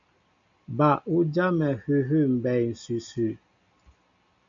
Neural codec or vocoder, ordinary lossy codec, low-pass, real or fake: none; MP3, 96 kbps; 7.2 kHz; real